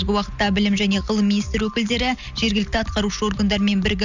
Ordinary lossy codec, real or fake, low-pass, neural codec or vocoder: none; real; 7.2 kHz; none